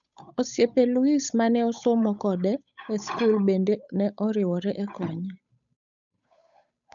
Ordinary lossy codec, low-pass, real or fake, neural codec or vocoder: none; 7.2 kHz; fake; codec, 16 kHz, 8 kbps, FunCodec, trained on Chinese and English, 25 frames a second